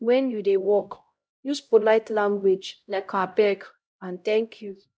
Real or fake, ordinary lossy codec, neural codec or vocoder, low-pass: fake; none; codec, 16 kHz, 0.5 kbps, X-Codec, HuBERT features, trained on LibriSpeech; none